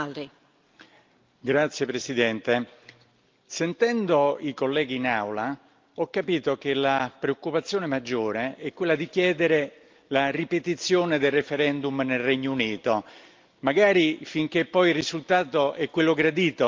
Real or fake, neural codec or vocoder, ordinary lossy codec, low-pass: real; none; Opus, 32 kbps; 7.2 kHz